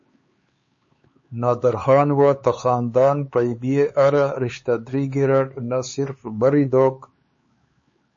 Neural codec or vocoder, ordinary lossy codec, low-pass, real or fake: codec, 16 kHz, 4 kbps, X-Codec, HuBERT features, trained on LibriSpeech; MP3, 32 kbps; 7.2 kHz; fake